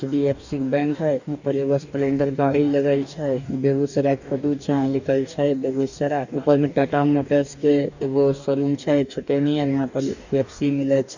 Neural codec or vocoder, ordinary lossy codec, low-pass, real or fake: codec, 44.1 kHz, 2.6 kbps, DAC; none; 7.2 kHz; fake